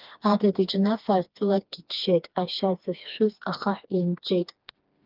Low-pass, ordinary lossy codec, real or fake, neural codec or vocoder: 5.4 kHz; Opus, 32 kbps; fake; codec, 16 kHz, 2 kbps, FreqCodec, smaller model